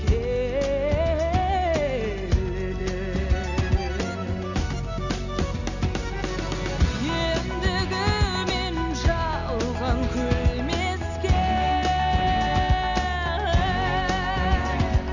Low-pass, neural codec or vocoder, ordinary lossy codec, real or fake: 7.2 kHz; none; none; real